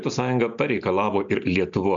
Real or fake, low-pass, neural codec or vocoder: real; 7.2 kHz; none